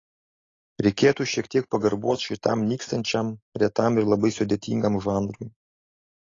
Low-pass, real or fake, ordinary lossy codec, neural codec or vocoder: 7.2 kHz; real; AAC, 32 kbps; none